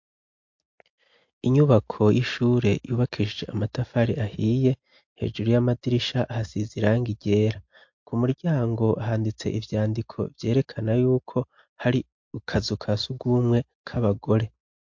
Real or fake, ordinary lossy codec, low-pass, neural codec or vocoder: real; MP3, 48 kbps; 7.2 kHz; none